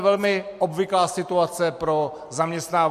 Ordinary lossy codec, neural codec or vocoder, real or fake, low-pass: MP3, 64 kbps; none; real; 14.4 kHz